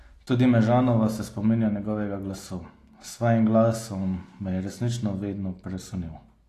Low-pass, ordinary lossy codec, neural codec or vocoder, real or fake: 14.4 kHz; AAC, 48 kbps; none; real